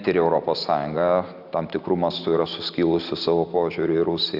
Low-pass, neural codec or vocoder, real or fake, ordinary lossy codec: 5.4 kHz; none; real; Opus, 64 kbps